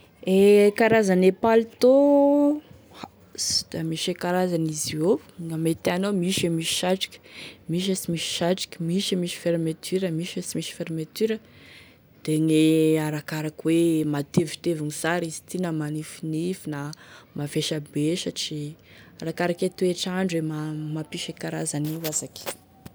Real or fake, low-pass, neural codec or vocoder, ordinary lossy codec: real; none; none; none